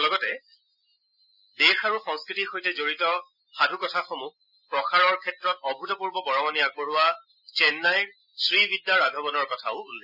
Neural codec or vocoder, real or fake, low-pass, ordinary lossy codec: none; real; 5.4 kHz; none